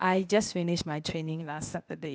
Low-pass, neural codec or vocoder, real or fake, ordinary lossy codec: none; codec, 16 kHz, 0.8 kbps, ZipCodec; fake; none